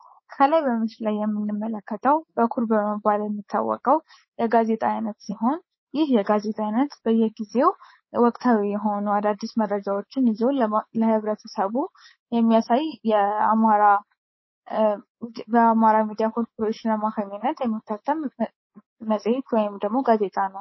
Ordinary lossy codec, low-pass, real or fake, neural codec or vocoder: MP3, 24 kbps; 7.2 kHz; fake; codec, 24 kHz, 3.1 kbps, DualCodec